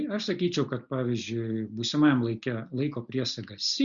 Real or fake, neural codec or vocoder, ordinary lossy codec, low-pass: real; none; Opus, 64 kbps; 7.2 kHz